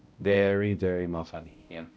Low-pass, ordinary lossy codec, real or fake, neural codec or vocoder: none; none; fake; codec, 16 kHz, 0.5 kbps, X-Codec, HuBERT features, trained on balanced general audio